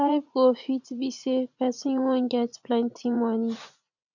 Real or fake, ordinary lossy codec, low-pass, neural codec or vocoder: fake; none; 7.2 kHz; vocoder, 44.1 kHz, 80 mel bands, Vocos